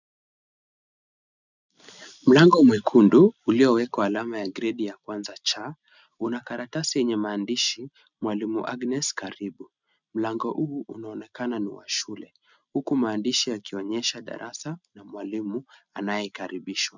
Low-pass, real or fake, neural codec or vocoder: 7.2 kHz; real; none